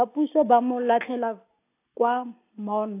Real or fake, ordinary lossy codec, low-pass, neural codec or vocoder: real; AAC, 24 kbps; 3.6 kHz; none